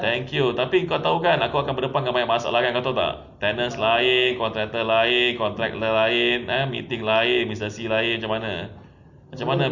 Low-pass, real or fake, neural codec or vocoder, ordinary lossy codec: 7.2 kHz; real; none; none